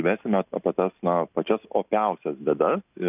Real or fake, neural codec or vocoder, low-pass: real; none; 3.6 kHz